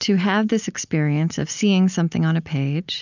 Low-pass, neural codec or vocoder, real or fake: 7.2 kHz; none; real